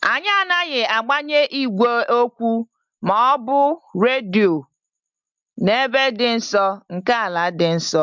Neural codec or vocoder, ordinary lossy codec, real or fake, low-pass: none; MP3, 64 kbps; real; 7.2 kHz